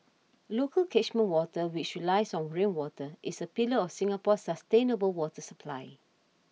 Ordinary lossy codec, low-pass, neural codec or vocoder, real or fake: none; none; none; real